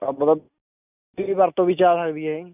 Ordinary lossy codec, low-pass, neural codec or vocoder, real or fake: none; 3.6 kHz; none; real